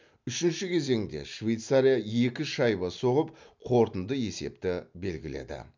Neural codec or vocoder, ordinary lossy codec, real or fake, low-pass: none; MP3, 64 kbps; real; 7.2 kHz